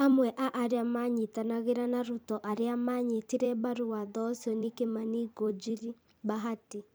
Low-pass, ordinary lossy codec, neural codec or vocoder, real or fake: none; none; vocoder, 44.1 kHz, 128 mel bands every 256 samples, BigVGAN v2; fake